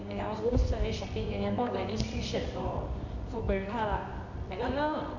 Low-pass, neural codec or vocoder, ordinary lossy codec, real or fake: 7.2 kHz; codec, 24 kHz, 0.9 kbps, WavTokenizer, medium music audio release; none; fake